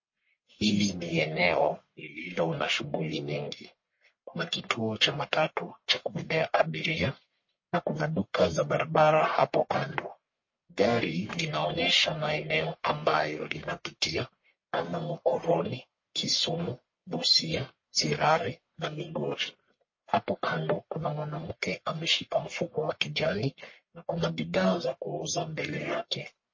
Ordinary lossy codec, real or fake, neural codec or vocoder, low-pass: MP3, 32 kbps; fake; codec, 44.1 kHz, 1.7 kbps, Pupu-Codec; 7.2 kHz